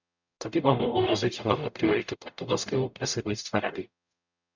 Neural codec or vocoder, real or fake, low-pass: codec, 44.1 kHz, 0.9 kbps, DAC; fake; 7.2 kHz